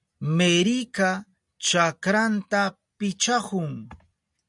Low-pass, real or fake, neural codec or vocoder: 10.8 kHz; real; none